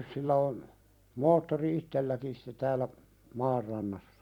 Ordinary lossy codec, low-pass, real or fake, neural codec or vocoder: none; 19.8 kHz; fake; vocoder, 44.1 kHz, 128 mel bands every 256 samples, BigVGAN v2